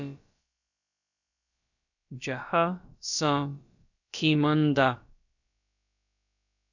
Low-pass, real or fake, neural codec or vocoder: 7.2 kHz; fake; codec, 16 kHz, about 1 kbps, DyCAST, with the encoder's durations